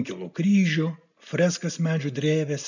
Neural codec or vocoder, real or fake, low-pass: none; real; 7.2 kHz